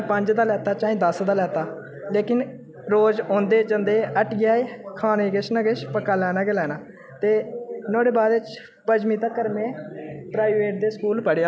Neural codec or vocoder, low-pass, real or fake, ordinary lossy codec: none; none; real; none